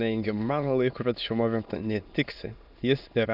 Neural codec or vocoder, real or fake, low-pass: autoencoder, 22.05 kHz, a latent of 192 numbers a frame, VITS, trained on many speakers; fake; 5.4 kHz